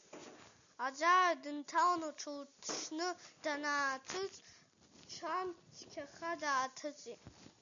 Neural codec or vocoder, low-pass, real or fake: none; 7.2 kHz; real